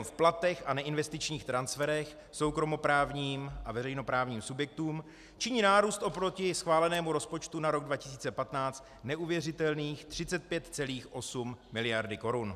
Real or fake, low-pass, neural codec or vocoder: real; 14.4 kHz; none